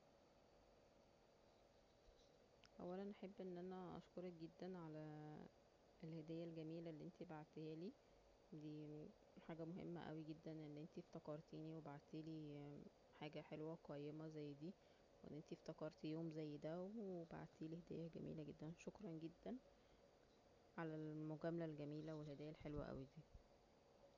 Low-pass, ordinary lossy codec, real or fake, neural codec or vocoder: 7.2 kHz; none; real; none